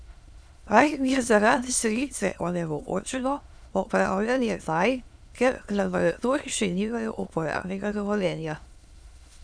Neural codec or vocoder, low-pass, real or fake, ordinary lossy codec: autoencoder, 22.05 kHz, a latent of 192 numbers a frame, VITS, trained on many speakers; none; fake; none